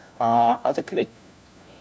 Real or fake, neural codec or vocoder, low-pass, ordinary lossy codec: fake; codec, 16 kHz, 1 kbps, FunCodec, trained on LibriTTS, 50 frames a second; none; none